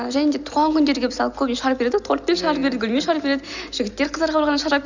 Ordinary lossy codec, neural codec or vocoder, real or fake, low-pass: none; none; real; 7.2 kHz